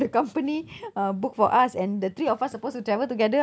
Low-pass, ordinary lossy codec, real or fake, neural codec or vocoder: none; none; real; none